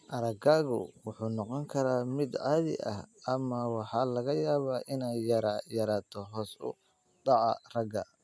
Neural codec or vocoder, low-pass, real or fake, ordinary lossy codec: none; none; real; none